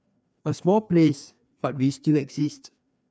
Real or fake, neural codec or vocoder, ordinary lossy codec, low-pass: fake; codec, 16 kHz, 2 kbps, FreqCodec, larger model; none; none